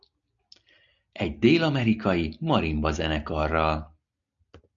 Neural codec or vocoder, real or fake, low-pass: none; real; 7.2 kHz